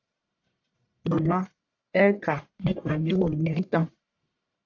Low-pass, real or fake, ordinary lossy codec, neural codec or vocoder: 7.2 kHz; fake; AAC, 48 kbps; codec, 44.1 kHz, 1.7 kbps, Pupu-Codec